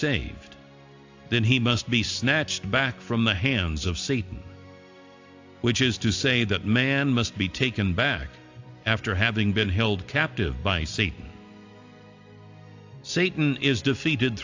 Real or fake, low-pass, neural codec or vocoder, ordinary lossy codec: real; 7.2 kHz; none; AAC, 48 kbps